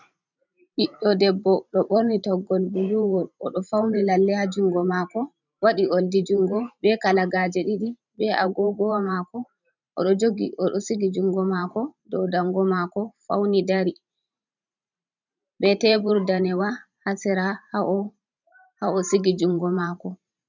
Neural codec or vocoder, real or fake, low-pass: vocoder, 44.1 kHz, 128 mel bands every 512 samples, BigVGAN v2; fake; 7.2 kHz